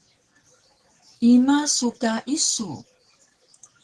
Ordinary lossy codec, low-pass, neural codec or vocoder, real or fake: Opus, 16 kbps; 10.8 kHz; codec, 44.1 kHz, 7.8 kbps, DAC; fake